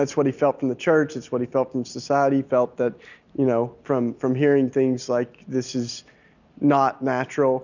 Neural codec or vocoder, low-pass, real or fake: none; 7.2 kHz; real